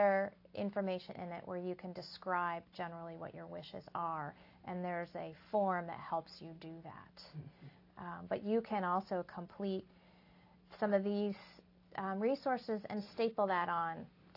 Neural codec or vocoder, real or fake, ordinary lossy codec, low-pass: none; real; AAC, 32 kbps; 5.4 kHz